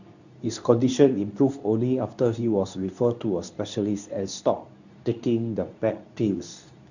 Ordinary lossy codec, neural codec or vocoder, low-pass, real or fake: none; codec, 24 kHz, 0.9 kbps, WavTokenizer, medium speech release version 2; 7.2 kHz; fake